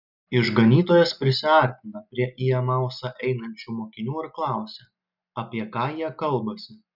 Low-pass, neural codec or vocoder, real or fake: 5.4 kHz; none; real